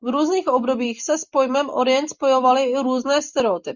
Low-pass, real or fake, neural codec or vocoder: 7.2 kHz; real; none